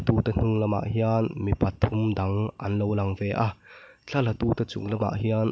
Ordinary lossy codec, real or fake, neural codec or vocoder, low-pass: none; real; none; none